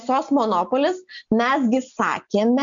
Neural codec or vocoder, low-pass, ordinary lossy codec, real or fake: none; 7.2 kHz; MP3, 64 kbps; real